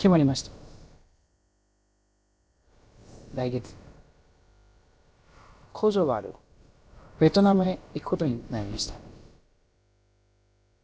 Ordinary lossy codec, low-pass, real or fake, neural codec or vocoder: none; none; fake; codec, 16 kHz, about 1 kbps, DyCAST, with the encoder's durations